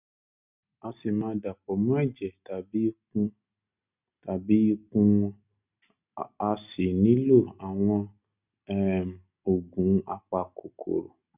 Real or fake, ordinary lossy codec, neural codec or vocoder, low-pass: real; none; none; 3.6 kHz